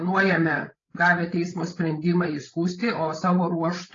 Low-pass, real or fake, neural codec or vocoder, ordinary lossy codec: 7.2 kHz; fake; codec, 16 kHz, 16 kbps, FreqCodec, larger model; AAC, 32 kbps